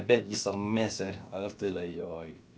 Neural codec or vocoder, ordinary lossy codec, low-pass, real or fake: codec, 16 kHz, about 1 kbps, DyCAST, with the encoder's durations; none; none; fake